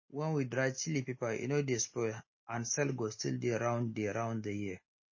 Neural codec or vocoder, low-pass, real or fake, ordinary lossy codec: none; 7.2 kHz; real; MP3, 32 kbps